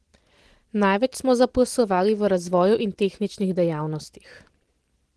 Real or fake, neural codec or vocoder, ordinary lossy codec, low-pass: real; none; Opus, 16 kbps; 10.8 kHz